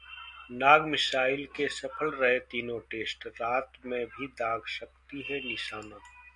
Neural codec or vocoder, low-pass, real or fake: none; 10.8 kHz; real